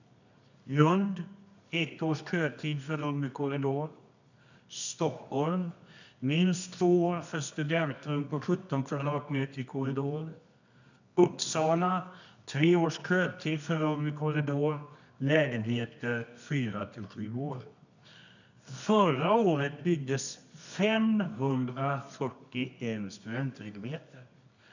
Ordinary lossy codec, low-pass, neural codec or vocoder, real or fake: none; 7.2 kHz; codec, 24 kHz, 0.9 kbps, WavTokenizer, medium music audio release; fake